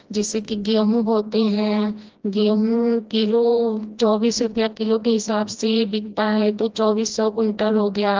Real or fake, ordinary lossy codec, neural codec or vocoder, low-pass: fake; Opus, 24 kbps; codec, 16 kHz, 1 kbps, FreqCodec, smaller model; 7.2 kHz